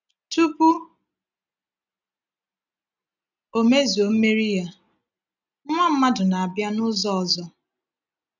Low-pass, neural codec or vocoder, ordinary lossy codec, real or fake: 7.2 kHz; none; none; real